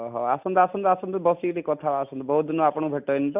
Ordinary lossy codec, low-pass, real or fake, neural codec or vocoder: none; 3.6 kHz; real; none